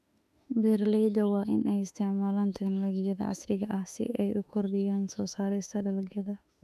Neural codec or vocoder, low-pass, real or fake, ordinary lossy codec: autoencoder, 48 kHz, 32 numbers a frame, DAC-VAE, trained on Japanese speech; 14.4 kHz; fake; none